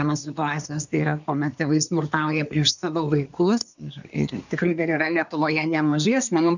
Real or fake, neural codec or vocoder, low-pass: fake; codec, 24 kHz, 1 kbps, SNAC; 7.2 kHz